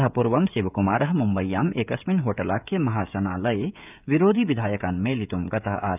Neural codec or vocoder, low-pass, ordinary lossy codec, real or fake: codec, 16 kHz, 16 kbps, FreqCodec, smaller model; 3.6 kHz; none; fake